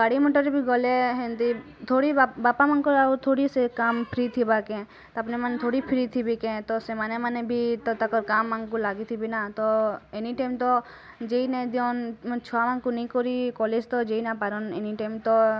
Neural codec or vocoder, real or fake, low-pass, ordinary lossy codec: none; real; none; none